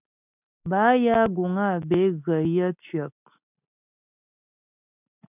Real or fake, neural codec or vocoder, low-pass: real; none; 3.6 kHz